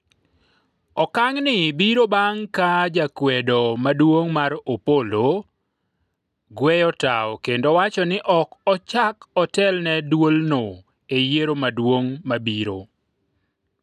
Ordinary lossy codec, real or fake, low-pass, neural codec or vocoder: none; real; 14.4 kHz; none